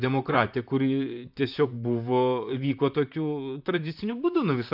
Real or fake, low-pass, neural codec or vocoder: fake; 5.4 kHz; vocoder, 44.1 kHz, 128 mel bands, Pupu-Vocoder